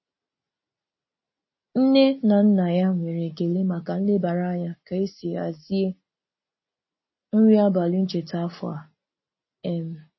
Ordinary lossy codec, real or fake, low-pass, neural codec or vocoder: MP3, 24 kbps; real; 7.2 kHz; none